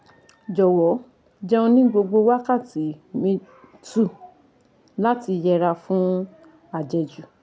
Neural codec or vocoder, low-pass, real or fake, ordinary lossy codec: none; none; real; none